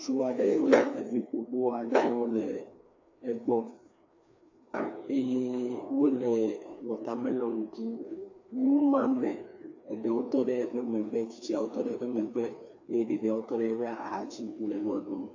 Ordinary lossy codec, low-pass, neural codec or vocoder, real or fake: AAC, 48 kbps; 7.2 kHz; codec, 16 kHz, 2 kbps, FreqCodec, larger model; fake